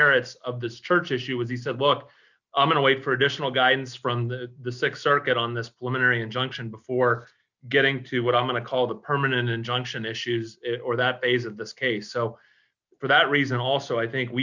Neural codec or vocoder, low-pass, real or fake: none; 7.2 kHz; real